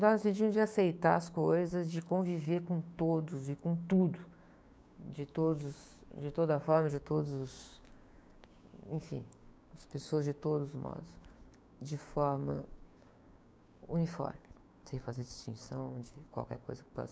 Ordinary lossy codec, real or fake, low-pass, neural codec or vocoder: none; fake; none; codec, 16 kHz, 6 kbps, DAC